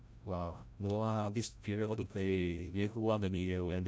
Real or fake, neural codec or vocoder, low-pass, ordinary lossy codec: fake; codec, 16 kHz, 0.5 kbps, FreqCodec, larger model; none; none